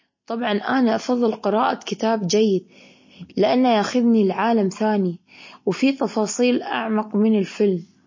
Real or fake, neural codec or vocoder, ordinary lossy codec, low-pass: real; none; MP3, 32 kbps; 7.2 kHz